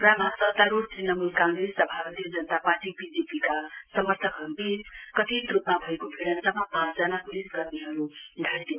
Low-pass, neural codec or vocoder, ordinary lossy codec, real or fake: 3.6 kHz; none; Opus, 24 kbps; real